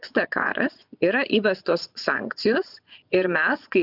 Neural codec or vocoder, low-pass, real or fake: vocoder, 24 kHz, 100 mel bands, Vocos; 5.4 kHz; fake